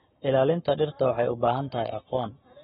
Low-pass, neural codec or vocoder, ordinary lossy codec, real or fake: 19.8 kHz; codec, 44.1 kHz, 7.8 kbps, Pupu-Codec; AAC, 16 kbps; fake